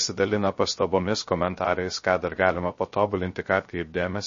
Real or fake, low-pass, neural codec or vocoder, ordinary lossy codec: fake; 7.2 kHz; codec, 16 kHz, 0.3 kbps, FocalCodec; MP3, 32 kbps